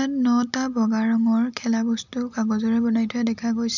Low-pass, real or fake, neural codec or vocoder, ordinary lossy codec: 7.2 kHz; real; none; none